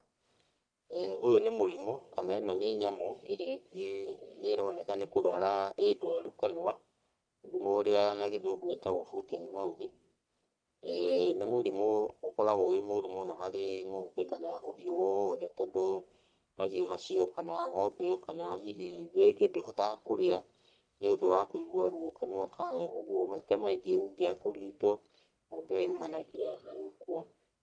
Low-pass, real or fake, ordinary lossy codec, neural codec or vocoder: 9.9 kHz; fake; none; codec, 44.1 kHz, 1.7 kbps, Pupu-Codec